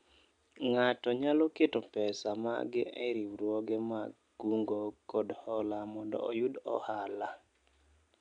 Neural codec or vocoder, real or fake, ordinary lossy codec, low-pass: none; real; none; 9.9 kHz